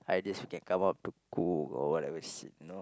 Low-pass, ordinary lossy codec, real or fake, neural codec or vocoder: none; none; real; none